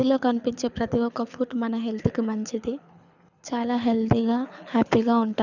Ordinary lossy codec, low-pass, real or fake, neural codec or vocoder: none; 7.2 kHz; fake; codec, 24 kHz, 6 kbps, HILCodec